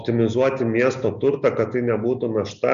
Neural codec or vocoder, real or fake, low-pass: none; real; 7.2 kHz